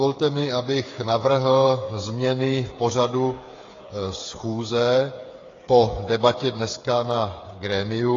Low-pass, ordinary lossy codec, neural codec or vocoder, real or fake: 7.2 kHz; AAC, 32 kbps; codec, 16 kHz, 8 kbps, FreqCodec, smaller model; fake